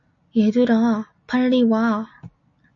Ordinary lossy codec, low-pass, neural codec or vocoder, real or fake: MP3, 48 kbps; 7.2 kHz; none; real